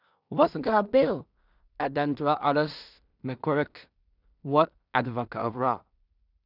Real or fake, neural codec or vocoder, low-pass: fake; codec, 16 kHz in and 24 kHz out, 0.4 kbps, LongCat-Audio-Codec, two codebook decoder; 5.4 kHz